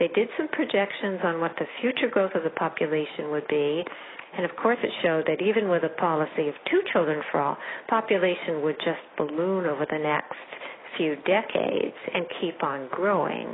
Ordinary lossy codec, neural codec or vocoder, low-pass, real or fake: AAC, 16 kbps; none; 7.2 kHz; real